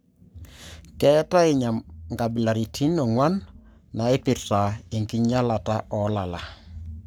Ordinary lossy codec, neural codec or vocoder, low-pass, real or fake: none; codec, 44.1 kHz, 7.8 kbps, Pupu-Codec; none; fake